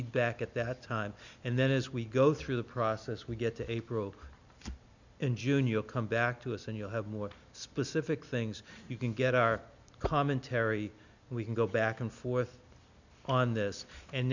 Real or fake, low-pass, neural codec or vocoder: real; 7.2 kHz; none